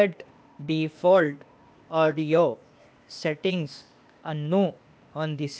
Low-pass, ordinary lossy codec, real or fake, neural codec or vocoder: none; none; fake; codec, 16 kHz, 0.8 kbps, ZipCodec